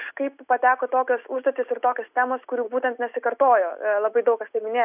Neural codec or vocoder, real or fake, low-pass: none; real; 3.6 kHz